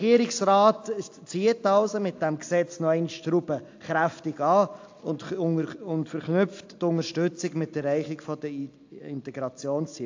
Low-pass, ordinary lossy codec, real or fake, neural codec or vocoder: 7.2 kHz; AAC, 48 kbps; real; none